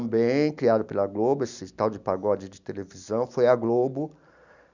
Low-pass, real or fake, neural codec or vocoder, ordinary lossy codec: 7.2 kHz; real; none; none